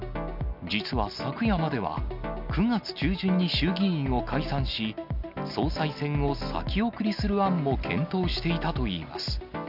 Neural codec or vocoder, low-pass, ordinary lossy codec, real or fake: none; 5.4 kHz; none; real